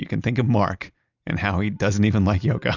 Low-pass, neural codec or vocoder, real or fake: 7.2 kHz; none; real